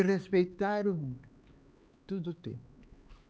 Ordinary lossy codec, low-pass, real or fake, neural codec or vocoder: none; none; fake; codec, 16 kHz, 4 kbps, X-Codec, HuBERT features, trained on LibriSpeech